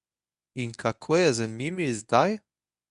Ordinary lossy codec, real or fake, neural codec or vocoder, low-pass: none; fake; codec, 24 kHz, 0.9 kbps, WavTokenizer, medium speech release version 1; 10.8 kHz